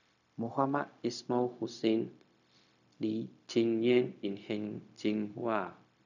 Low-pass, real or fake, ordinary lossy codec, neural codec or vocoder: 7.2 kHz; fake; none; codec, 16 kHz, 0.4 kbps, LongCat-Audio-Codec